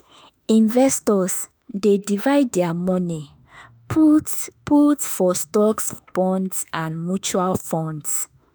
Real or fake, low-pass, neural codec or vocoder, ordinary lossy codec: fake; none; autoencoder, 48 kHz, 32 numbers a frame, DAC-VAE, trained on Japanese speech; none